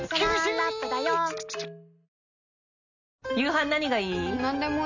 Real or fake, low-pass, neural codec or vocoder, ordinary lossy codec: real; 7.2 kHz; none; none